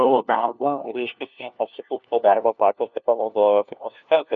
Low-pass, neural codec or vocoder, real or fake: 7.2 kHz; codec, 16 kHz, 1 kbps, FunCodec, trained on LibriTTS, 50 frames a second; fake